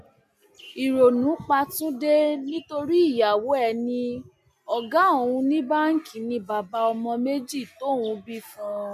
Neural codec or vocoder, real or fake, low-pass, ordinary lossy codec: none; real; 14.4 kHz; MP3, 96 kbps